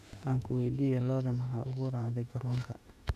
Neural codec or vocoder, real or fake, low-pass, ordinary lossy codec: autoencoder, 48 kHz, 32 numbers a frame, DAC-VAE, trained on Japanese speech; fake; 14.4 kHz; none